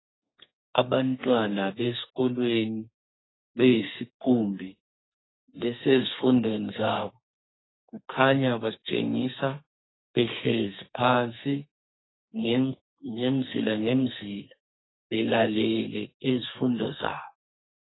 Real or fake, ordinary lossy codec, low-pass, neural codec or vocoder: fake; AAC, 16 kbps; 7.2 kHz; codec, 32 kHz, 1.9 kbps, SNAC